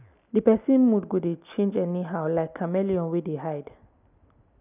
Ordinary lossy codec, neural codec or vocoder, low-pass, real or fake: none; none; 3.6 kHz; real